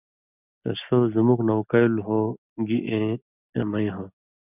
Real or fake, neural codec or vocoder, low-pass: real; none; 3.6 kHz